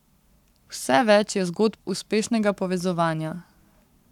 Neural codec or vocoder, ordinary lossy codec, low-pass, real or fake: codec, 44.1 kHz, 7.8 kbps, Pupu-Codec; none; 19.8 kHz; fake